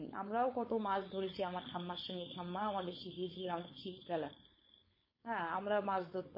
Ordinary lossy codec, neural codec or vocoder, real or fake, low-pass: MP3, 24 kbps; codec, 16 kHz, 4.8 kbps, FACodec; fake; 5.4 kHz